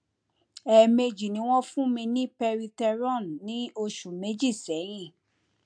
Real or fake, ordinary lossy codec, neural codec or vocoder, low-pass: real; MP3, 64 kbps; none; 9.9 kHz